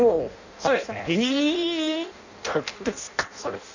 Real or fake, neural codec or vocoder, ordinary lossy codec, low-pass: fake; codec, 16 kHz in and 24 kHz out, 0.6 kbps, FireRedTTS-2 codec; none; 7.2 kHz